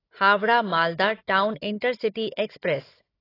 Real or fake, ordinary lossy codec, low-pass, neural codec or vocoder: fake; AAC, 24 kbps; 5.4 kHz; codec, 16 kHz, 16 kbps, FunCodec, trained on Chinese and English, 50 frames a second